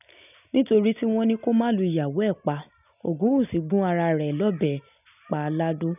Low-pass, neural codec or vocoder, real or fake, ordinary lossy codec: 3.6 kHz; none; real; none